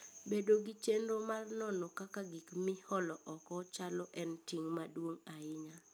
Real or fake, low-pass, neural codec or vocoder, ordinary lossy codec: real; none; none; none